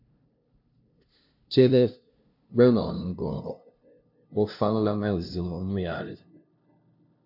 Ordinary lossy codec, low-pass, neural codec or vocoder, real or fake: AAC, 48 kbps; 5.4 kHz; codec, 16 kHz, 0.5 kbps, FunCodec, trained on LibriTTS, 25 frames a second; fake